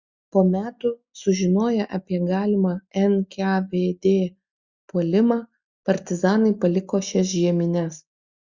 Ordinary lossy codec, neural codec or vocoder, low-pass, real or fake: Opus, 64 kbps; none; 7.2 kHz; real